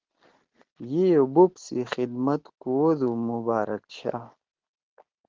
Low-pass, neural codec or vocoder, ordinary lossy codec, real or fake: 7.2 kHz; none; Opus, 16 kbps; real